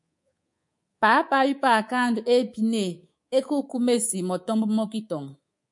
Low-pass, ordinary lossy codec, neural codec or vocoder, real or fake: 10.8 kHz; MP3, 48 kbps; codec, 24 kHz, 3.1 kbps, DualCodec; fake